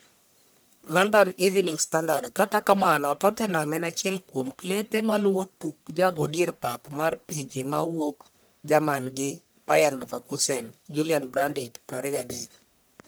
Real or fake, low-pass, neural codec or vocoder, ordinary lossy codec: fake; none; codec, 44.1 kHz, 1.7 kbps, Pupu-Codec; none